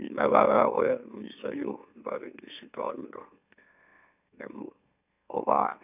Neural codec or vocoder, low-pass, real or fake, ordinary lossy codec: autoencoder, 44.1 kHz, a latent of 192 numbers a frame, MeloTTS; 3.6 kHz; fake; none